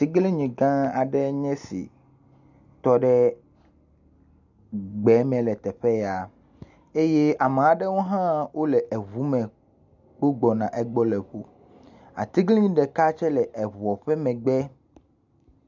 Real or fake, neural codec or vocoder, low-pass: real; none; 7.2 kHz